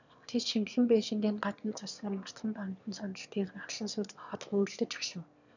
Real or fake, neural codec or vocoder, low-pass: fake; autoencoder, 22.05 kHz, a latent of 192 numbers a frame, VITS, trained on one speaker; 7.2 kHz